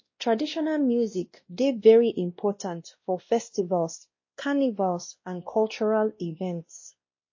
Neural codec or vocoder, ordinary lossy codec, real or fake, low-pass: codec, 16 kHz, 1 kbps, X-Codec, WavLM features, trained on Multilingual LibriSpeech; MP3, 32 kbps; fake; 7.2 kHz